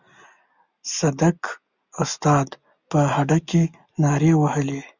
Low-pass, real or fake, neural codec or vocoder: 7.2 kHz; real; none